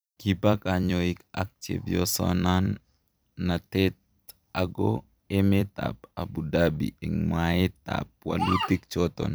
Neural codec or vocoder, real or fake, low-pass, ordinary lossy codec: vocoder, 44.1 kHz, 128 mel bands every 256 samples, BigVGAN v2; fake; none; none